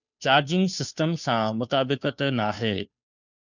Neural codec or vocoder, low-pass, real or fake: codec, 16 kHz, 2 kbps, FunCodec, trained on Chinese and English, 25 frames a second; 7.2 kHz; fake